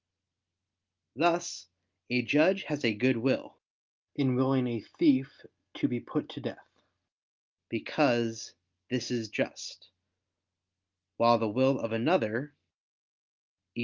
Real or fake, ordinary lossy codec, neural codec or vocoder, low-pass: real; Opus, 24 kbps; none; 7.2 kHz